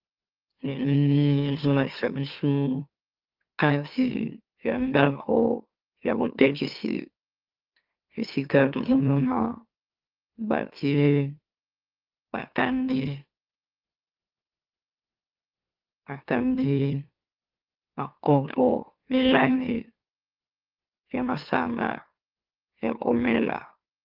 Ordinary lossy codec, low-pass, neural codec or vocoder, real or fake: Opus, 24 kbps; 5.4 kHz; autoencoder, 44.1 kHz, a latent of 192 numbers a frame, MeloTTS; fake